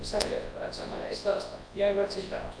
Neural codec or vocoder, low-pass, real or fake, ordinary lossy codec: codec, 24 kHz, 0.9 kbps, WavTokenizer, large speech release; 9.9 kHz; fake; MP3, 64 kbps